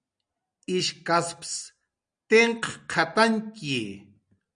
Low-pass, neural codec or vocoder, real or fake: 9.9 kHz; none; real